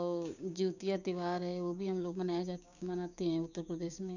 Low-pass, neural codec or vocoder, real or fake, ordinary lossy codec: 7.2 kHz; codec, 16 kHz, 6 kbps, DAC; fake; none